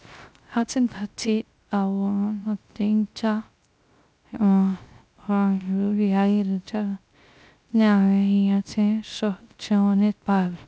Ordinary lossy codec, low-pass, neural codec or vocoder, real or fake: none; none; codec, 16 kHz, 0.3 kbps, FocalCodec; fake